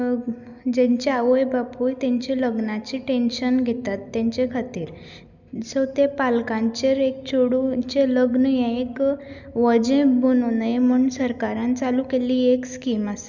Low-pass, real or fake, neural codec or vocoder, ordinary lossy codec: 7.2 kHz; real; none; none